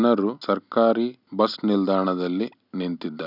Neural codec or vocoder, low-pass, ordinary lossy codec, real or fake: none; 5.4 kHz; none; real